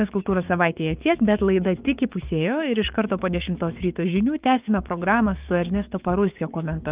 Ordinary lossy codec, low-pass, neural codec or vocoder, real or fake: Opus, 24 kbps; 3.6 kHz; codec, 16 kHz, 6 kbps, DAC; fake